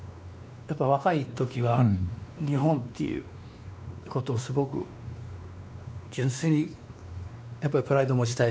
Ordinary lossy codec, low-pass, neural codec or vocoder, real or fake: none; none; codec, 16 kHz, 2 kbps, X-Codec, WavLM features, trained on Multilingual LibriSpeech; fake